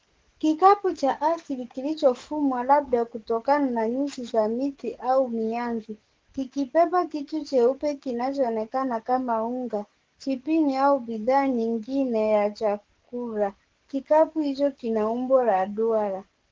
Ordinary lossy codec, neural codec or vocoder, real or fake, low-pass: Opus, 16 kbps; codec, 44.1 kHz, 7.8 kbps, DAC; fake; 7.2 kHz